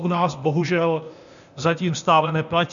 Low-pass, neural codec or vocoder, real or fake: 7.2 kHz; codec, 16 kHz, 0.8 kbps, ZipCodec; fake